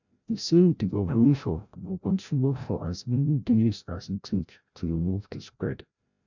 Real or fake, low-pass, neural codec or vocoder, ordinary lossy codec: fake; 7.2 kHz; codec, 16 kHz, 0.5 kbps, FreqCodec, larger model; none